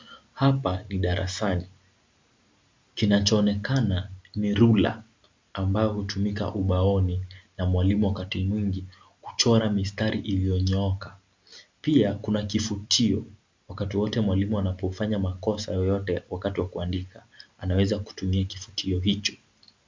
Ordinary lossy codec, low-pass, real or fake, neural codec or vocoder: MP3, 64 kbps; 7.2 kHz; real; none